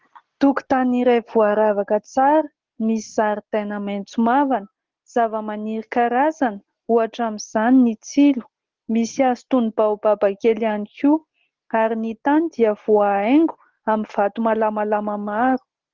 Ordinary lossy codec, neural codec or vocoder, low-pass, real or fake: Opus, 16 kbps; none; 7.2 kHz; real